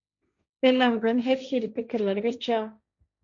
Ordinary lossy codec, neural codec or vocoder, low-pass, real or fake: AAC, 64 kbps; codec, 16 kHz, 1.1 kbps, Voila-Tokenizer; 7.2 kHz; fake